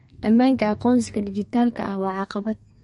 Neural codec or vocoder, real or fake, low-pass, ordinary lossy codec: codec, 32 kHz, 1.9 kbps, SNAC; fake; 14.4 kHz; MP3, 48 kbps